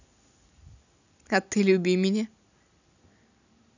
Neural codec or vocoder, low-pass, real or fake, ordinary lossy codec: none; 7.2 kHz; real; none